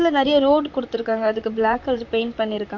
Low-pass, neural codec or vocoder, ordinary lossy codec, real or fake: 7.2 kHz; vocoder, 44.1 kHz, 128 mel bands, Pupu-Vocoder; MP3, 48 kbps; fake